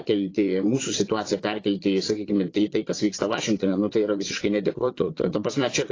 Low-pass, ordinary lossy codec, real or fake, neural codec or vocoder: 7.2 kHz; AAC, 32 kbps; fake; vocoder, 22.05 kHz, 80 mel bands, Vocos